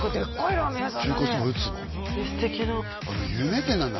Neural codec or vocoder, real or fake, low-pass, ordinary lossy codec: none; real; 7.2 kHz; MP3, 24 kbps